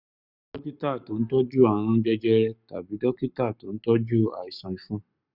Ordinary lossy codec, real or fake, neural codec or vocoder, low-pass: none; fake; codec, 44.1 kHz, 7.8 kbps, Pupu-Codec; 5.4 kHz